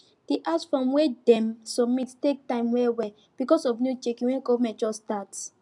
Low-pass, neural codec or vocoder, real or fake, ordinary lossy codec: 10.8 kHz; none; real; MP3, 96 kbps